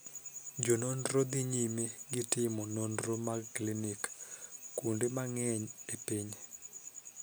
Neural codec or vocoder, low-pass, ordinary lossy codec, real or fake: none; none; none; real